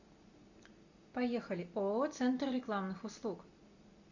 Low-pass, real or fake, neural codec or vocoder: 7.2 kHz; real; none